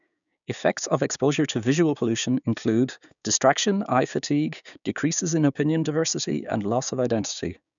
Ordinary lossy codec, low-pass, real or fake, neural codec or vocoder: none; 7.2 kHz; fake; codec, 16 kHz, 6 kbps, DAC